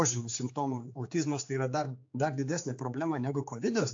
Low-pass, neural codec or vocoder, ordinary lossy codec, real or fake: 7.2 kHz; codec, 16 kHz, 4 kbps, X-Codec, HuBERT features, trained on general audio; AAC, 48 kbps; fake